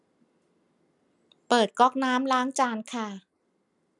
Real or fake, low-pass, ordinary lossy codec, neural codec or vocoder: real; 10.8 kHz; none; none